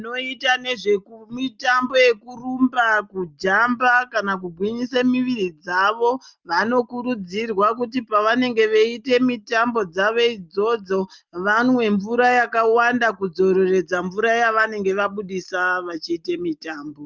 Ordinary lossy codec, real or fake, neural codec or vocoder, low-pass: Opus, 24 kbps; real; none; 7.2 kHz